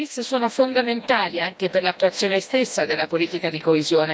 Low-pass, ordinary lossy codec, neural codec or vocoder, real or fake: none; none; codec, 16 kHz, 1 kbps, FreqCodec, smaller model; fake